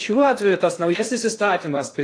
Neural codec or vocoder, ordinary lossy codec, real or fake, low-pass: codec, 16 kHz in and 24 kHz out, 0.6 kbps, FocalCodec, streaming, 2048 codes; AAC, 48 kbps; fake; 9.9 kHz